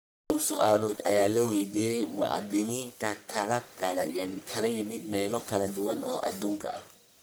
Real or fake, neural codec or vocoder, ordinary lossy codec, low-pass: fake; codec, 44.1 kHz, 1.7 kbps, Pupu-Codec; none; none